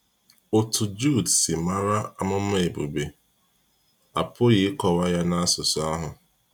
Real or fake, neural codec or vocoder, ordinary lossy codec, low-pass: real; none; none; none